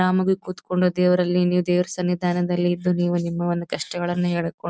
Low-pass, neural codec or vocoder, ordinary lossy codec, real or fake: none; none; none; real